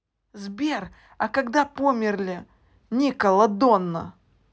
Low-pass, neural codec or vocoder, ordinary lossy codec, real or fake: none; none; none; real